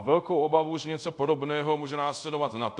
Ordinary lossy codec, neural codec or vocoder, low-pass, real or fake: AAC, 64 kbps; codec, 24 kHz, 0.5 kbps, DualCodec; 10.8 kHz; fake